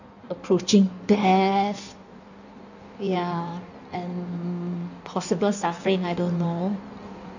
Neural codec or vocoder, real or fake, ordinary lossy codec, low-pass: codec, 16 kHz in and 24 kHz out, 1.1 kbps, FireRedTTS-2 codec; fake; none; 7.2 kHz